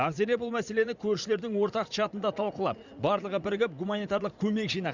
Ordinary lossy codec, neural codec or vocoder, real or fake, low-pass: Opus, 64 kbps; vocoder, 44.1 kHz, 80 mel bands, Vocos; fake; 7.2 kHz